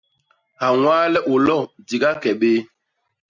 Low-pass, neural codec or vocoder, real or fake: 7.2 kHz; none; real